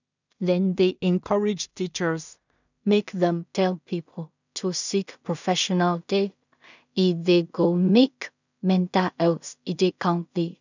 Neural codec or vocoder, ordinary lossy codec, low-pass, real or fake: codec, 16 kHz in and 24 kHz out, 0.4 kbps, LongCat-Audio-Codec, two codebook decoder; none; 7.2 kHz; fake